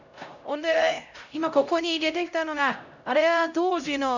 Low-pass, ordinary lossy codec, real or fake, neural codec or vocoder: 7.2 kHz; none; fake; codec, 16 kHz, 0.5 kbps, X-Codec, HuBERT features, trained on LibriSpeech